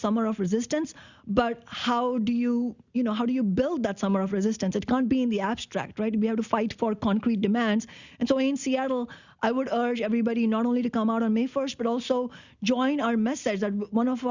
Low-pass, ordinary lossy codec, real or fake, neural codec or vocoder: 7.2 kHz; Opus, 64 kbps; real; none